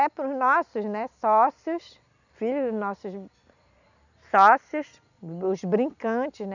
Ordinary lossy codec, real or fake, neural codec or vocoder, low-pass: none; real; none; 7.2 kHz